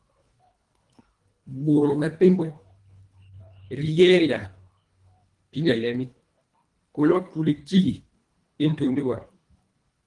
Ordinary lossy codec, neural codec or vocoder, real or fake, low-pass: Opus, 24 kbps; codec, 24 kHz, 1.5 kbps, HILCodec; fake; 10.8 kHz